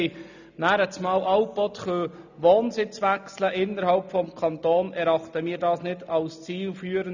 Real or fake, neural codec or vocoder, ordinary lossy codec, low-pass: real; none; none; 7.2 kHz